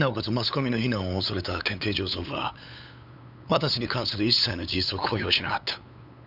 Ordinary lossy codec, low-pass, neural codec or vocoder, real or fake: none; 5.4 kHz; codec, 16 kHz, 8 kbps, FunCodec, trained on LibriTTS, 25 frames a second; fake